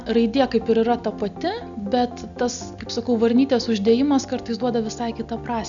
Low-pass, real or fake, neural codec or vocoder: 7.2 kHz; real; none